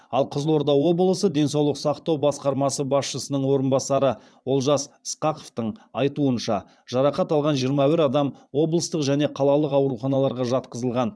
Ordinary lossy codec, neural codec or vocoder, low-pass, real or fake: none; vocoder, 22.05 kHz, 80 mel bands, Vocos; none; fake